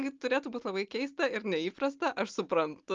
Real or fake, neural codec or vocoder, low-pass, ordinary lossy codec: real; none; 7.2 kHz; Opus, 24 kbps